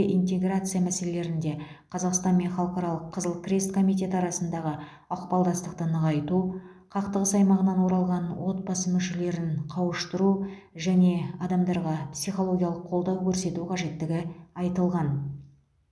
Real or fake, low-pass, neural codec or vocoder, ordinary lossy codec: real; none; none; none